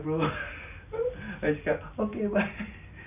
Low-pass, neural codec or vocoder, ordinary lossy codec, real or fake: 3.6 kHz; none; none; real